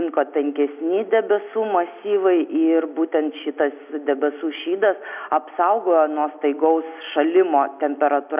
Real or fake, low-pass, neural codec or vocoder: real; 3.6 kHz; none